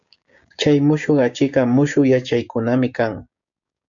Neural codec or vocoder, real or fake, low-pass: codec, 16 kHz, 6 kbps, DAC; fake; 7.2 kHz